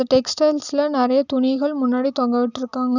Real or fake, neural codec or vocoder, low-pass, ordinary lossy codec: real; none; 7.2 kHz; none